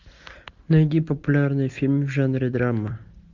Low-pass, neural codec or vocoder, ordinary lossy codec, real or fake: 7.2 kHz; none; MP3, 64 kbps; real